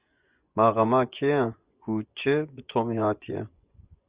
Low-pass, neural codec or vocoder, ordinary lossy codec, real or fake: 3.6 kHz; vocoder, 24 kHz, 100 mel bands, Vocos; Opus, 64 kbps; fake